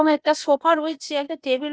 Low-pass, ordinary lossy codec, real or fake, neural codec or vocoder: none; none; fake; codec, 16 kHz, 0.8 kbps, ZipCodec